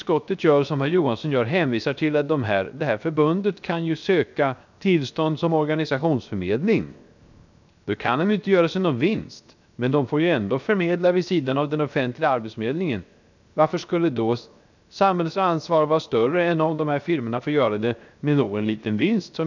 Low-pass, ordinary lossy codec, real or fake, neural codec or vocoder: 7.2 kHz; none; fake; codec, 16 kHz, 0.7 kbps, FocalCodec